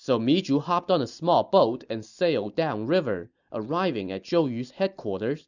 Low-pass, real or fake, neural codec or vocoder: 7.2 kHz; real; none